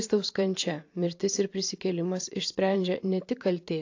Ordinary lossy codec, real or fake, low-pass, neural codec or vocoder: AAC, 48 kbps; fake; 7.2 kHz; vocoder, 44.1 kHz, 128 mel bands every 512 samples, BigVGAN v2